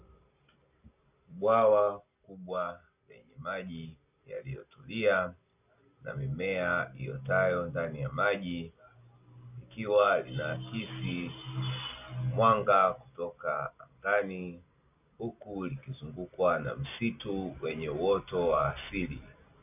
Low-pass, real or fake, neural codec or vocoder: 3.6 kHz; real; none